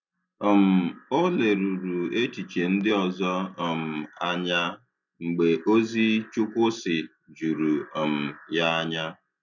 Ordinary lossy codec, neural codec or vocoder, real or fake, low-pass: none; none; real; 7.2 kHz